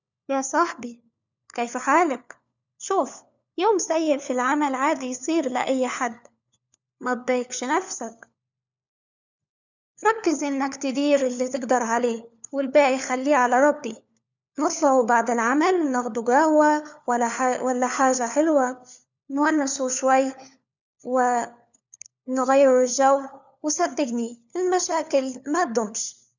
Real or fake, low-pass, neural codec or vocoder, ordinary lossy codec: fake; 7.2 kHz; codec, 16 kHz, 4 kbps, FunCodec, trained on LibriTTS, 50 frames a second; none